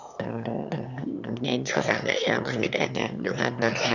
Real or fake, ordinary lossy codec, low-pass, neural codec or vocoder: fake; none; 7.2 kHz; autoencoder, 22.05 kHz, a latent of 192 numbers a frame, VITS, trained on one speaker